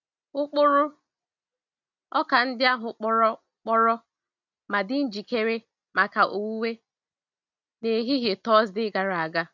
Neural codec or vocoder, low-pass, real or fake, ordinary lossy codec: none; 7.2 kHz; real; none